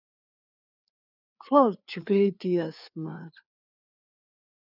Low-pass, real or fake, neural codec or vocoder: 5.4 kHz; fake; codec, 16 kHz, 4 kbps, FreqCodec, larger model